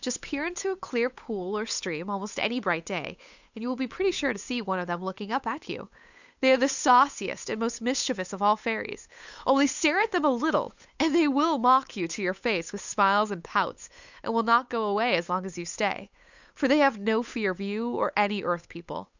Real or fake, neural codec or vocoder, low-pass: fake; codec, 16 kHz, 8 kbps, FunCodec, trained on Chinese and English, 25 frames a second; 7.2 kHz